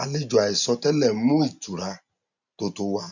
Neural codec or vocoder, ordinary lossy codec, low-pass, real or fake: none; none; 7.2 kHz; real